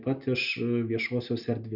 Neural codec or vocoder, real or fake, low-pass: none; real; 5.4 kHz